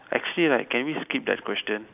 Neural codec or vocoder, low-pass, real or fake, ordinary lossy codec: none; 3.6 kHz; real; none